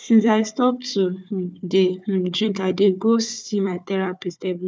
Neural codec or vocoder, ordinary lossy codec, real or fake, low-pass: codec, 16 kHz, 4 kbps, FunCodec, trained on Chinese and English, 50 frames a second; none; fake; none